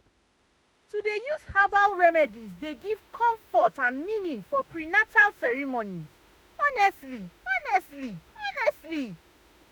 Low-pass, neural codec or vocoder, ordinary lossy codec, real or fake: none; autoencoder, 48 kHz, 32 numbers a frame, DAC-VAE, trained on Japanese speech; none; fake